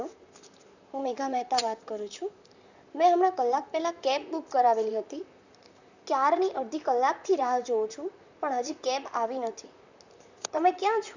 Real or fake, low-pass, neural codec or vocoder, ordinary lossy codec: fake; 7.2 kHz; vocoder, 44.1 kHz, 128 mel bands, Pupu-Vocoder; none